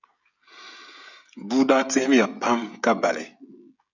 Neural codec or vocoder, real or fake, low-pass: codec, 16 kHz, 16 kbps, FreqCodec, smaller model; fake; 7.2 kHz